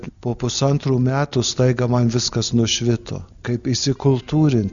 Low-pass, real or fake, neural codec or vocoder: 7.2 kHz; real; none